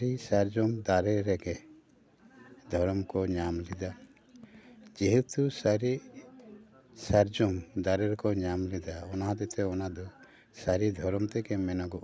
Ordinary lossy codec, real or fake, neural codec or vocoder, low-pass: none; real; none; none